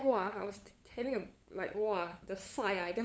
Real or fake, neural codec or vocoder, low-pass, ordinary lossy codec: fake; codec, 16 kHz, 8 kbps, FunCodec, trained on LibriTTS, 25 frames a second; none; none